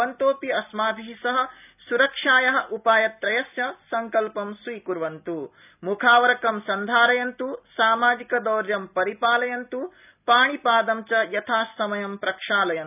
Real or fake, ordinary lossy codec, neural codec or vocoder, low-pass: real; none; none; 3.6 kHz